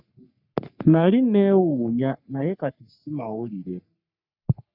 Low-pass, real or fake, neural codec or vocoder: 5.4 kHz; fake; codec, 44.1 kHz, 3.4 kbps, Pupu-Codec